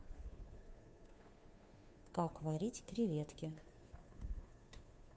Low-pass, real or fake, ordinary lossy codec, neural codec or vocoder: none; fake; none; codec, 16 kHz, 2 kbps, FunCodec, trained on Chinese and English, 25 frames a second